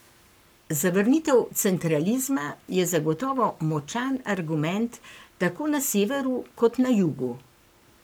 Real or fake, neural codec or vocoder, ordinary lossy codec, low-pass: fake; codec, 44.1 kHz, 7.8 kbps, Pupu-Codec; none; none